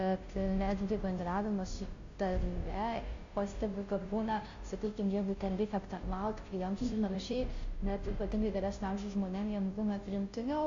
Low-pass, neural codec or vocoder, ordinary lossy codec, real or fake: 7.2 kHz; codec, 16 kHz, 0.5 kbps, FunCodec, trained on Chinese and English, 25 frames a second; AAC, 48 kbps; fake